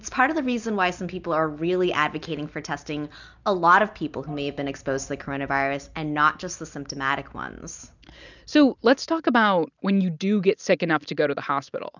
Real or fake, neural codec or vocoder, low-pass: real; none; 7.2 kHz